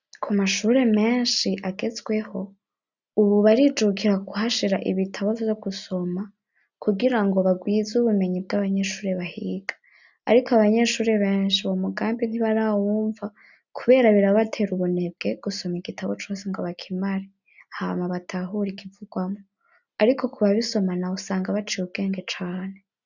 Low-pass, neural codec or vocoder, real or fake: 7.2 kHz; none; real